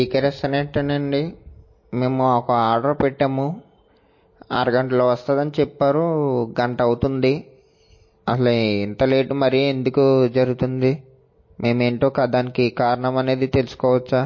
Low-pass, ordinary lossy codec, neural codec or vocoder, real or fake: 7.2 kHz; MP3, 32 kbps; none; real